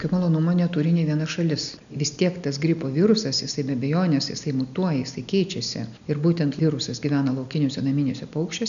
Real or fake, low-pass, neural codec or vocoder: real; 7.2 kHz; none